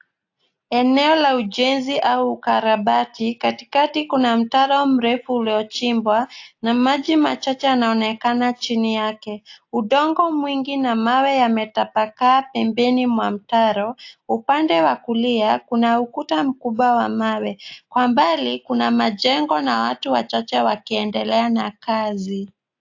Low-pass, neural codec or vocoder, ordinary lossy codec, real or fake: 7.2 kHz; none; AAC, 48 kbps; real